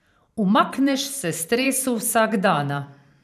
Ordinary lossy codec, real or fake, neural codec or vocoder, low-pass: none; fake; vocoder, 44.1 kHz, 128 mel bands every 512 samples, BigVGAN v2; 14.4 kHz